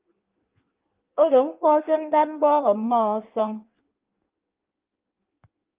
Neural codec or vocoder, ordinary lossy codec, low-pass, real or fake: codec, 16 kHz in and 24 kHz out, 1.1 kbps, FireRedTTS-2 codec; Opus, 24 kbps; 3.6 kHz; fake